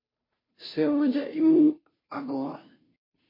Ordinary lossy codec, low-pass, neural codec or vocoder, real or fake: MP3, 24 kbps; 5.4 kHz; codec, 16 kHz, 0.5 kbps, FunCodec, trained on Chinese and English, 25 frames a second; fake